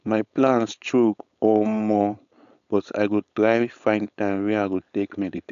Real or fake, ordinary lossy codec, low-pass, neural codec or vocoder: fake; MP3, 96 kbps; 7.2 kHz; codec, 16 kHz, 4.8 kbps, FACodec